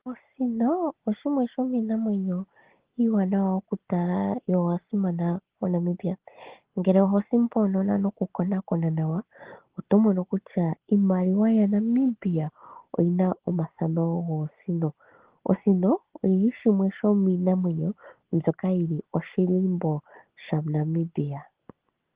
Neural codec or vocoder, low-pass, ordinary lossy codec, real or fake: none; 3.6 kHz; Opus, 16 kbps; real